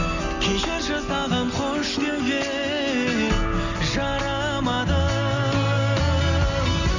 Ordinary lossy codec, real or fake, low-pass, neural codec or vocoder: none; real; 7.2 kHz; none